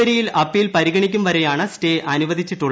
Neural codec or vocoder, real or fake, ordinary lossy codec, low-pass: none; real; none; none